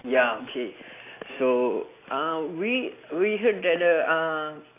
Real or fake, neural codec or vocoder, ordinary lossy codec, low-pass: real; none; AAC, 24 kbps; 3.6 kHz